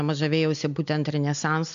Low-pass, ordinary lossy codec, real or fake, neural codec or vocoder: 7.2 kHz; MP3, 64 kbps; real; none